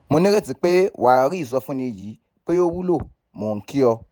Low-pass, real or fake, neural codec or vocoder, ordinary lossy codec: none; fake; vocoder, 48 kHz, 128 mel bands, Vocos; none